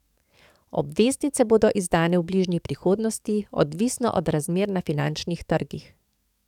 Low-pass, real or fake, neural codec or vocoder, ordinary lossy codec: 19.8 kHz; fake; codec, 44.1 kHz, 7.8 kbps, DAC; none